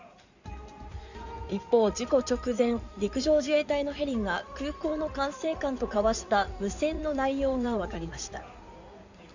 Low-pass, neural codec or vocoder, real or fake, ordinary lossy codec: 7.2 kHz; codec, 16 kHz in and 24 kHz out, 2.2 kbps, FireRedTTS-2 codec; fake; none